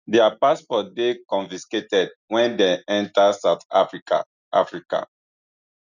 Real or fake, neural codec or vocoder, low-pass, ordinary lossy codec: real; none; 7.2 kHz; none